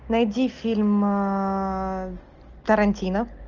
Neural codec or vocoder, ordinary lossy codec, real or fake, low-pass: none; Opus, 32 kbps; real; 7.2 kHz